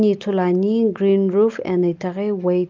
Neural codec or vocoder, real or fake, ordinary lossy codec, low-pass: none; real; Opus, 24 kbps; 7.2 kHz